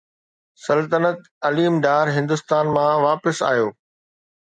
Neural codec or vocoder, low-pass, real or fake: none; 9.9 kHz; real